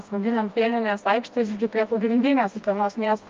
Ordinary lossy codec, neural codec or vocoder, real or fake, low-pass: Opus, 32 kbps; codec, 16 kHz, 1 kbps, FreqCodec, smaller model; fake; 7.2 kHz